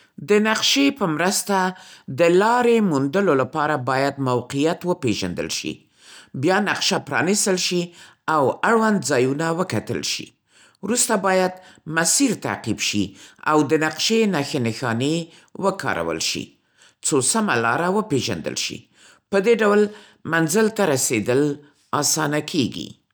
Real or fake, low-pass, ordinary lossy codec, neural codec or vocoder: real; none; none; none